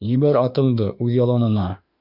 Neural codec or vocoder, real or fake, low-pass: autoencoder, 48 kHz, 32 numbers a frame, DAC-VAE, trained on Japanese speech; fake; 5.4 kHz